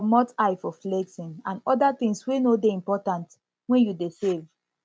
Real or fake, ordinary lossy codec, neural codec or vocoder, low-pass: real; none; none; none